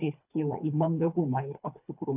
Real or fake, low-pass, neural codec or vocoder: fake; 3.6 kHz; codec, 24 kHz, 3 kbps, HILCodec